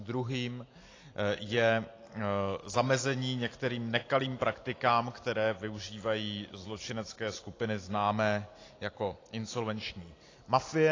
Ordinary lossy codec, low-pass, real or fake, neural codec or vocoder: AAC, 32 kbps; 7.2 kHz; real; none